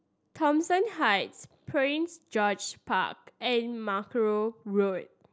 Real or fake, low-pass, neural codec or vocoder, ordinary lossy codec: real; none; none; none